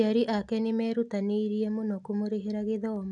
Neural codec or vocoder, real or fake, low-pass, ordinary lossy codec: none; real; 10.8 kHz; none